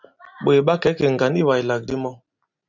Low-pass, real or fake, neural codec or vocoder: 7.2 kHz; real; none